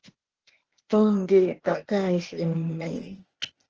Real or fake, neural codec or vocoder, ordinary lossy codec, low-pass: fake; codec, 16 kHz, 0.8 kbps, ZipCodec; Opus, 16 kbps; 7.2 kHz